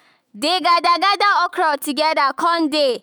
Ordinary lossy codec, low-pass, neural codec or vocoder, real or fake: none; none; autoencoder, 48 kHz, 128 numbers a frame, DAC-VAE, trained on Japanese speech; fake